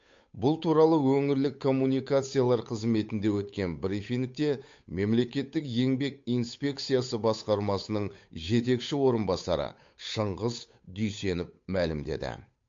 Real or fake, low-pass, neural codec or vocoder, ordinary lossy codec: fake; 7.2 kHz; codec, 16 kHz, 8 kbps, FunCodec, trained on Chinese and English, 25 frames a second; MP3, 48 kbps